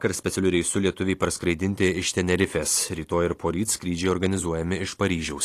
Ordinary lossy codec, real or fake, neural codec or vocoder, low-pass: AAC, 48 kbps; fake; vocoder, 44.1 kHz, 128 mel bands every 256 samples, BigVGAN v2; 14.4 kHz